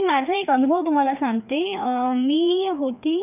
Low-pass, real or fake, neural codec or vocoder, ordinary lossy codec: 3.6 kHz; fake; codec, 16 kHz, 2 kbps, FreqCodec, larger model; none